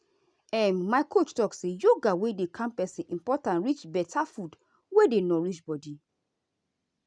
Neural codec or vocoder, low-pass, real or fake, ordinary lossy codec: none; 9.9 kHz; real; none